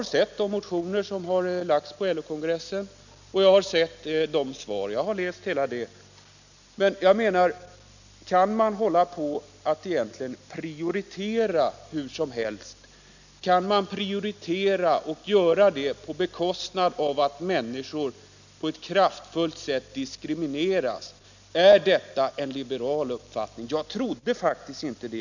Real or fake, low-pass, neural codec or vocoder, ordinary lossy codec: real; 7.2 kHz; none; none